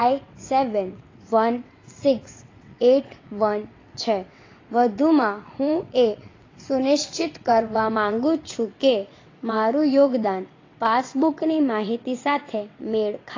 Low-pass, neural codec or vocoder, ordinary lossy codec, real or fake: 7.2 kHz; vocoder, 22.05 kHz, 80 mel bands, WaveNeXt; AAC, 32 kbps; fake